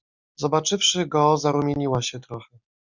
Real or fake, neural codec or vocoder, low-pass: real; none; 7.2 kHz